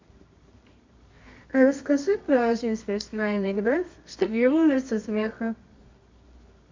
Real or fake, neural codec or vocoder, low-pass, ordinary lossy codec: fake; codec, 24 kHz, 0.9 kbps, WavTokenizer, medium music audio release; 7.2 kHz; MP3, 64 kbps